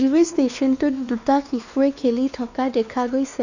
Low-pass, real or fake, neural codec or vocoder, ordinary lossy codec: 7.2 kHz; fake; codec, 16 kHz, 2 kbps, X-Codec, WavLM features, trained on Multilingual LibriSpeech; none